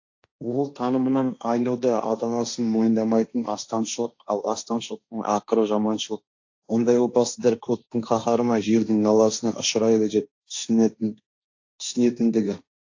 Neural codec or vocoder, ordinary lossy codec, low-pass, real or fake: codec, 16 kHz, 1.1 kbps, Voila-Tokenizer; AAC, 48 kbps; 7.2 kHz; fake